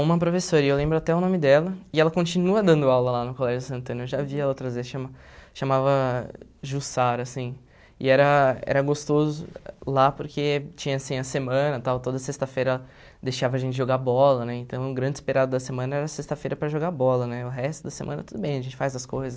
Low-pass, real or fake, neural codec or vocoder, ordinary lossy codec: none; real; none; none